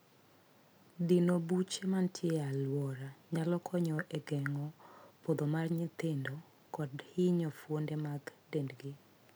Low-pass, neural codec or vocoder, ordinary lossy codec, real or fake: none; none; none; real